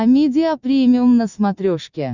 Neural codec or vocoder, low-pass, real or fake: none; 7.2 kHz; real